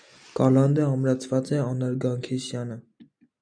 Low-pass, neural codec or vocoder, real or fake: 9.9 kHz; none; real